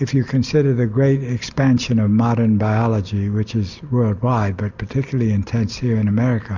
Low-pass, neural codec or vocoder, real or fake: 7.2 kHz; none; real